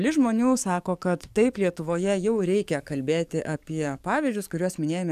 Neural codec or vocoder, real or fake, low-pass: codec, 44.1 kHz, 7.8 kbps, DAC; fake; 14.4 kHz